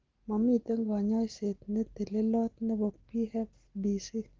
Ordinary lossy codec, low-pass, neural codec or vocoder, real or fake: Opus, 16 kbps; 7.2 kHz; none; real